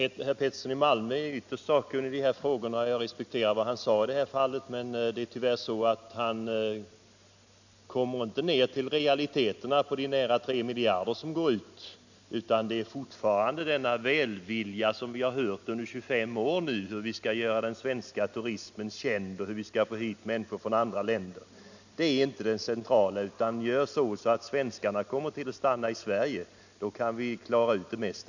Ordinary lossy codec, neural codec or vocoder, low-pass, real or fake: none; none; 7.2 kHz; real